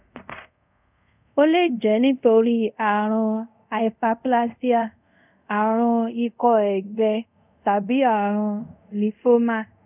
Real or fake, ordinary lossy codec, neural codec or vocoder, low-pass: fake; none; codec, 24 kHz, 0.5 kbps, DualCodec; 3.6 kHz